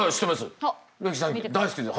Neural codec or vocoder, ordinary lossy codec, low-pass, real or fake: none; none; none; real